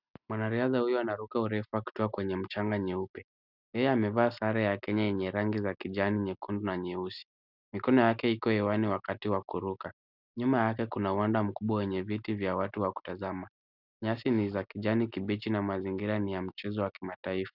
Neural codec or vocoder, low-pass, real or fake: none; 5.4 kHz; real